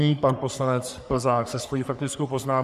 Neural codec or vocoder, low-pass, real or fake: codec, 44.1 kHz, 3.4 kbps, Pupu-Codec; 14.4 kHz; fake